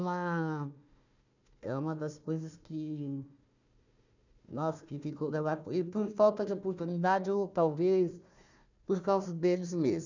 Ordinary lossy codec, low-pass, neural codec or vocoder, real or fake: none; 7.2 kHz; codec, 16 kHz, 1 kbps, FunCodec, trained on Chinese and English, 50 frames a second; fake